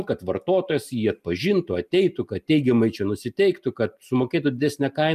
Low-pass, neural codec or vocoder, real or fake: 14.4 kHz; none; real